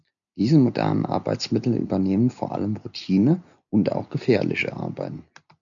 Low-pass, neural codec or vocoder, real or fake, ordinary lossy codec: 7.2 kHz; none; real; MP3, 96 kbps